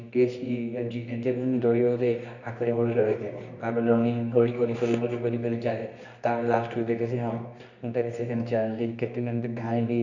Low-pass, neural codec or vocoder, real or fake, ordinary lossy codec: 7.2 kHz; codec, 24 kHz, 0.9 kbps, WavTokenizer, medium music audio release; fake; none